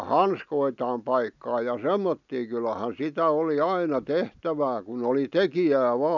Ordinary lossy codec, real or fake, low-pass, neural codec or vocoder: none; real; 7.2 kHz; none